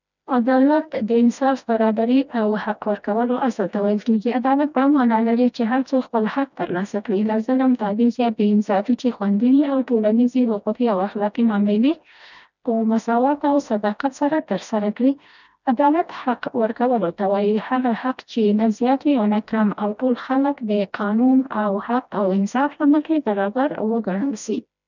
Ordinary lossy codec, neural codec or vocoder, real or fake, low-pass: none; codec, 16 kHz, 1 kbps, FreqCodec, smaller model; fake; 7.2 kHz